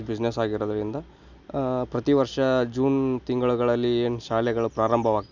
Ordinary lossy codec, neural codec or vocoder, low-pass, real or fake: none; none; 7.2 kHz; real